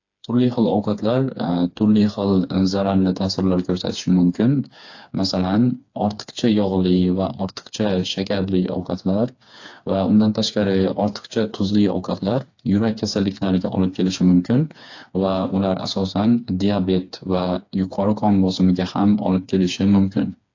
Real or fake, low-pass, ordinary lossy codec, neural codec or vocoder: fake; 7.2 kHz; AAC, 48 kbps; codec, 16 kHz, 4 kbps, FreqCodec, smaller model